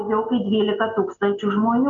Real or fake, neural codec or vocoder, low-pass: real; none; 7.2 kHz